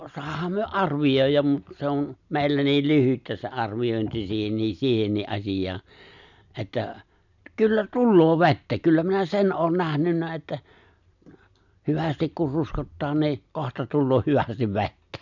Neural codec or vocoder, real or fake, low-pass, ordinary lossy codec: none; real; 7.2 kHz; none